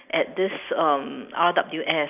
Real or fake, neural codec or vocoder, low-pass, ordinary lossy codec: real; none; 3.6 kHz; none